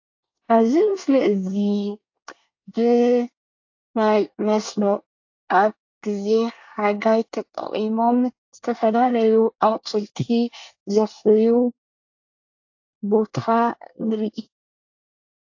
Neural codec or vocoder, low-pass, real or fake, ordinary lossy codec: codec, 24 kHz, 1 kbps, SNAC; 7.2 kHz; fake; AAC, 48 kbps